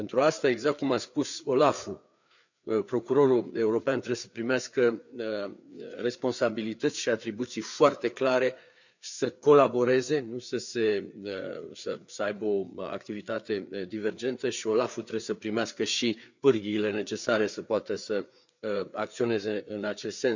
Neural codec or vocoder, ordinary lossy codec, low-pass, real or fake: codec, 16 kHz, 4 kbps, FreqCodec, larger model; none; 7.2 kHz; fake